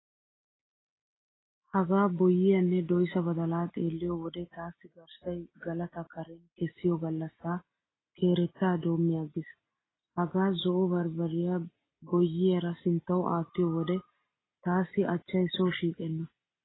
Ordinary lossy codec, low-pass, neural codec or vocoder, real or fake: AAC, 16 kbps; 7.2 kHz; none; real